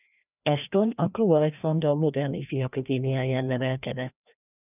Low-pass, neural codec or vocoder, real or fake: 3.6 kHz; codec, 16 kHz, 1 kbps, FreqCodec, larger model; fake